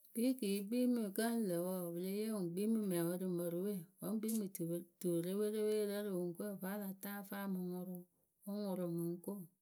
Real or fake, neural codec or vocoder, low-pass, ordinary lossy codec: real; none; none; none